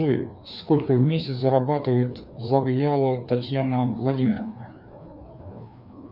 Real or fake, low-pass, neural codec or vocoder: fake; 5.4 kHz; codec, 16 kHz, 2 kbps, FreqCodec, larger model